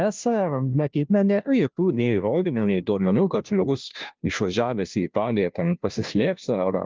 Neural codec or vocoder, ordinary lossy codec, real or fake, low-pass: codec, 16 kHz, 0.5 kbps, FunCodec, trained on Chinese and English, 25 frames a second; Opus, 24 kbps; fake; 7.2 kHz